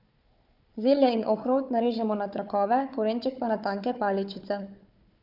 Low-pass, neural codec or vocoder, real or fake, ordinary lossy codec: 5.4 kHz; codec, 16 kHz, 4 kbps, FunCodec, trained on Chinese and English, 50 frames a second; fake; none